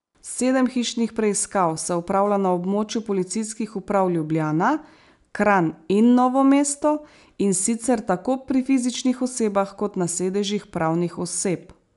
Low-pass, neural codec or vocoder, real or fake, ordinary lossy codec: 10.8 kHz; none; real; none